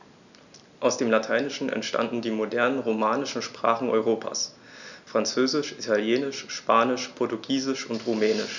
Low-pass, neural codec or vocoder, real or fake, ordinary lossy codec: 7.2 kHz; none; real; none